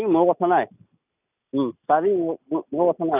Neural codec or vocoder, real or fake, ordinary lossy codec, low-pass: none; real; none; 3.6 kHz